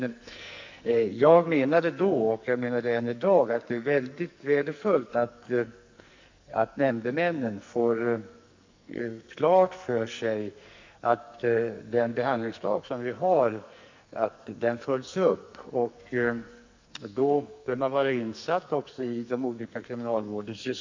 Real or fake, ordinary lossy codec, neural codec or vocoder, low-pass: fake; MP3, 64 kbps; codec, 44.1 kHz, 2.6 kbps, SNAC; 7.2 kHz